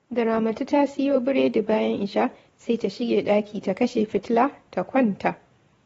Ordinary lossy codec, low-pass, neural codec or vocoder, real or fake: AAC, 24 kbps; 19.8 kHz; vocoder, 44.1 kHz, 128 mel bands every 512 samples, BigVGAN v2; fake